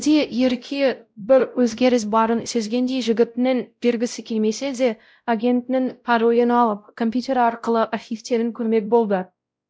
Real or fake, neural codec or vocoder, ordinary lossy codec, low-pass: fake; codec, 16 kHz, 0.5 kbps, X-Codec, WavLM features, trained on Multilingual LibriSpeech; none; none